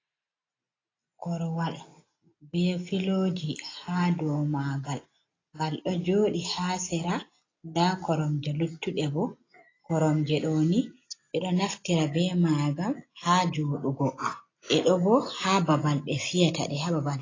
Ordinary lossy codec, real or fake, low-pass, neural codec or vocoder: AAC, 32 kbps; real; 7.2 kHz; none